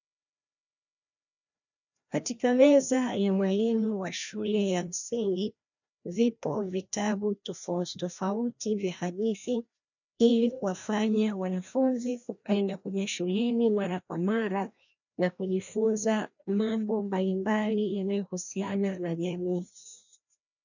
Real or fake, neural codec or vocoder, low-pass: fake; codec, 16 kHz, 1 kbps, FreqCodec, larger model; 7.2 kHz